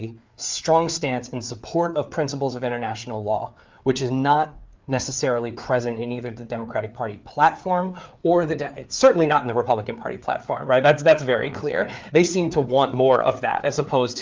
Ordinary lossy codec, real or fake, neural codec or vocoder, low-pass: Opus, 32 kbps; fake; codec, 16 kHz, 4 kbps, FreqCodec, larger model; 7.2 kHz